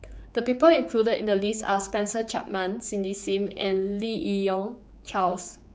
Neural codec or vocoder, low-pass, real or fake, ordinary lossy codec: codec, 16 kHz, 4 kbps, X-Codec, HuBERT features, trained on general audio; none; fake; none